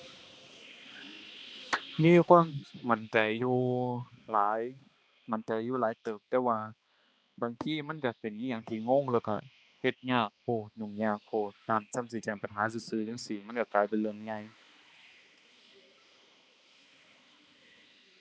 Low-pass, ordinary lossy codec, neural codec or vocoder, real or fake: none; none; codec, 16 kHz, 2 kbps, X-Codec, HuBERT features, trained on balanced general audio; fake